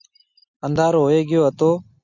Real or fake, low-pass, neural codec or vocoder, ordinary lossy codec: real; 7.2 kHz; none; Opus, 64 kbps